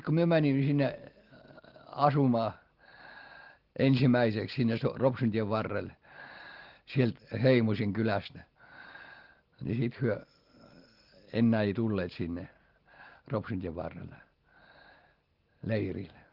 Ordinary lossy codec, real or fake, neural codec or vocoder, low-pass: Opus, 16 kbps; real; none; 5.4 kHz